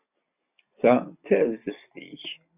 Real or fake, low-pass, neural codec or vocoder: real; 3.6 kHz; none